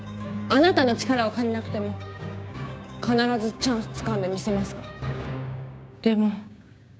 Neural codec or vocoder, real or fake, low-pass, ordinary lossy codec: codec, 16 kHz, 6 kbps, DAC; fake; none; none